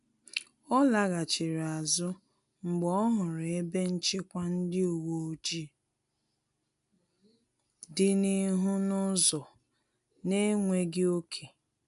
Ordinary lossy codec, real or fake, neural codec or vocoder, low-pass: none; real; none; 10.8 kHz